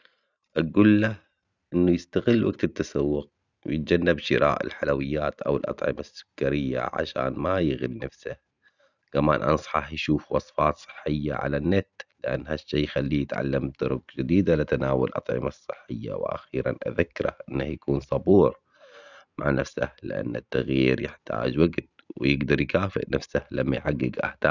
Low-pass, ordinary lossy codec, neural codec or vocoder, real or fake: 7.2 kHz; none; none; real